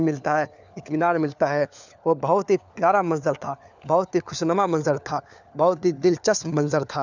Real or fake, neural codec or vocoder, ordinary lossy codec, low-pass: fake; codec, 16 kHz, 4 kbps, FunCodec, trained on LibriTTS, 50 frames a second; none; 7.2 kHz